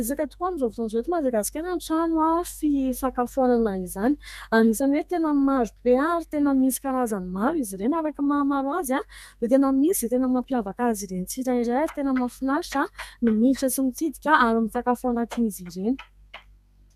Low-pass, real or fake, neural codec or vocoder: 14.4 kHz; fake; codec, 32 kHz, 1.9 kbps, SNAC